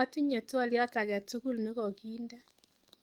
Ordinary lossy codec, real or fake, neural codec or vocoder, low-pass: Opus, 32 kbps; fake; codec, 44.1 kHz, 7.8 kbps, DAC; 19.8 kHz